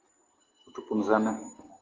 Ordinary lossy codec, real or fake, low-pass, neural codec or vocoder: Opus, 16 kbps; real; 7.2 kHz; none